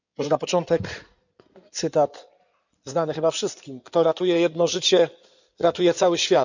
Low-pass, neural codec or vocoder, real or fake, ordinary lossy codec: 7.2 kHz; codec, 16 kHz in and 24 kHz out, 2.2 kbps, FireRedTTS-2 codec; fake; none